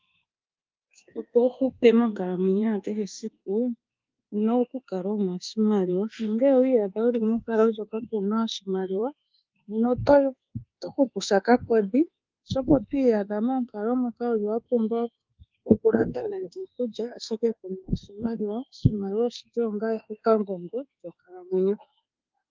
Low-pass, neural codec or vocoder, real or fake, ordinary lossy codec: 7.2 kHz; codec, 24 kHz, 1.2 kbps, DualCodec; fake; Opus, 32 kbps